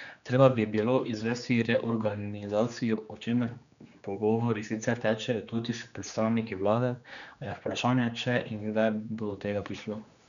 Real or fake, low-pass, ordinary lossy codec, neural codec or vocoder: fake; 7.2 kHz; none; codec, 16 kHz, 2 kbps, X-Codec, HuBERT features, trained on general audio